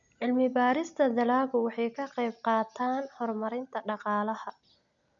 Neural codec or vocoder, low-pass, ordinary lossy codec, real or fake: none; 7.2 kHz; none; real